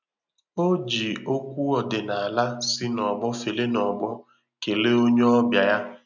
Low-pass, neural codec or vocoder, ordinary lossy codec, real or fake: 7.2 kHz; none; none; real